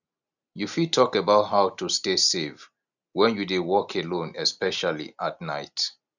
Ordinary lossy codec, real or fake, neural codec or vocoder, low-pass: none; real; none; 7.2 kHz